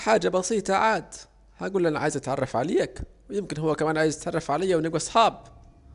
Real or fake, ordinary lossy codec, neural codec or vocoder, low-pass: real; MP3, 96 kbps; none; 10.8 kHz